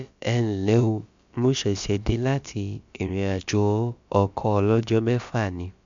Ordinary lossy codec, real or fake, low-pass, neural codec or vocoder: MP3, 64 kbps; fake; 7.2 kHz; codec, 16 kHz, about 1 kbps, DyCAST, with the encoder's durations